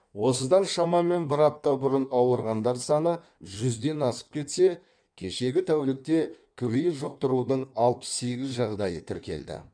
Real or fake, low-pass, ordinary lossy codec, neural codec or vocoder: fake; 9.9 kHz; none; codec, 16 kHz in and 24 kHz out, 1.1 kbps, FireRedTTS-2 codec